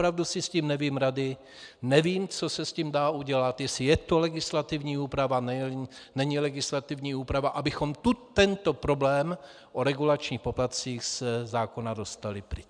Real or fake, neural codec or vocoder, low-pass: real; none; 9.9 kHz